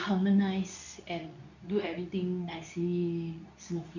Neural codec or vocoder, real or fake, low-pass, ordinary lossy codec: codec, 24 kHz, 0.9 kbps, WavTokenizer, medium speech release version 2; fake; 7.2 kHz; none